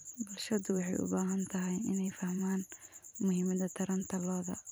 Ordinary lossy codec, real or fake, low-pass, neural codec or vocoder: none; fake; none; vocoder, 44.1 kHz, 128 mel bands every 512 samples, BigVGAN v2